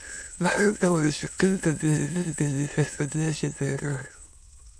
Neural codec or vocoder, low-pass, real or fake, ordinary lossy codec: autoencoder, 22.05 kHz, a latent of 192 numbers a frame, VITS, trained on many speakers; none; fake; none